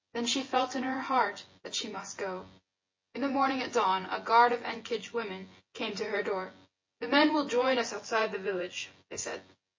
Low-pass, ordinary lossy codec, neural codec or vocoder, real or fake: 7.2 kHz; MP3, 32 kbps; vocoder, 24 kHz, 100 mel bands, Vocos; fake